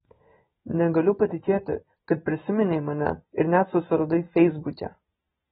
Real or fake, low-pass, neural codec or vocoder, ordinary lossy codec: real; 19.8 kHz; none; AAC, 16 kbps